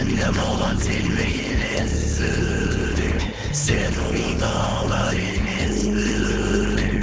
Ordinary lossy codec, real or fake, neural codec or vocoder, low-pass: none; fake; codec, 16 kHz, 4.8 kbps, FACodec; none